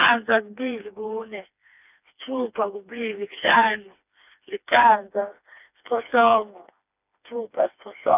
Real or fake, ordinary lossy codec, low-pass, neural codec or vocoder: fake; none; 3.6 kHz; codec, 16 kHz, 2 kbps, FreqCodec, smaller model